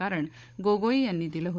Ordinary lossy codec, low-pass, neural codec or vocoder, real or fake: none; none; codec, 16 kHz, 16 kbps, FunCodec, trained on Chinese and English, 50 frames a second; fake